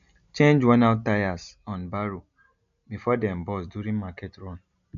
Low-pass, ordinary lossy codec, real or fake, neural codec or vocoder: 7.2 kHz; none; real; none